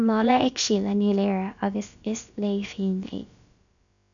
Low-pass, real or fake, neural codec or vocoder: 7.2 kHz; fake; codec, 16 kHz, about 1 kbps, DyCAST, with the encoder's durations